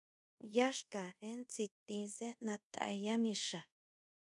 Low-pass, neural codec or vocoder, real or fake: 10.8 kHz; codec, 24 kHz, 0.5 kbps, DualCodec; fake